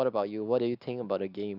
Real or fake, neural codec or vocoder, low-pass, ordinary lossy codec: real; none; 5.4 kHz; none